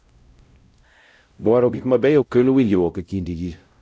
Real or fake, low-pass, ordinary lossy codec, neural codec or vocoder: fake; none; none; codec, 16 kHz, 0.5 kbps, X-Codec, WavLM features, trained on Multilingual LibriSpeech